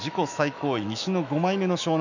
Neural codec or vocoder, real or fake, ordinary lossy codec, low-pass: autoencoder, 48 kHz, 128 numbers a frame, DAC-VAE, trained on Japanese speech; fake; none; 7.2 kHz